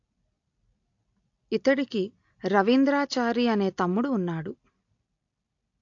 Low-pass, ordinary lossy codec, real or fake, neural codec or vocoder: 7.2 kHz; AAC, 48 kbps; real; none